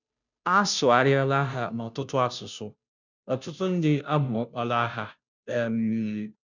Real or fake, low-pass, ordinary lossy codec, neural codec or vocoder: fake; 7.2 kHz; none; codec, 16 kHz, 0.5 kbps, FunCodec, trained on Chinese and English, 25 frames a second